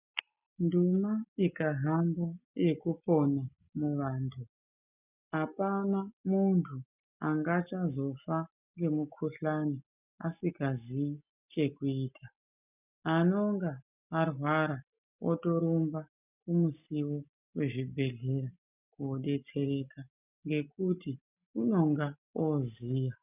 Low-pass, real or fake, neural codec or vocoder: 3.6 kHz; real; none